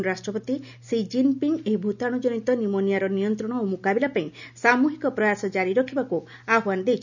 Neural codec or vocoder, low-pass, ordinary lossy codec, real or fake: none; 7.2 kHz; none; real